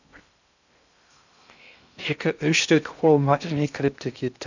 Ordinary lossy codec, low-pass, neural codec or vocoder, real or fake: none; 7.2 kHz; codec, 16 kHz in and 24 kHz out, 0.6 kbps, FocalCodec, streaming, 2048 codes; fake